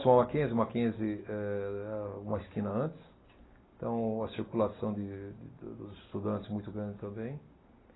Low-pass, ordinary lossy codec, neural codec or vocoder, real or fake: 7.2 kHz; AAC, 16 kbps; none; real